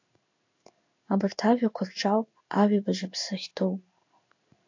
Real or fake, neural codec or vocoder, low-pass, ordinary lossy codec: fake; codec, 16 kHz in and 24 kHz out, 1 kbps, XY-Tokenizer; 7.2 kHz; AAC, 48 kbps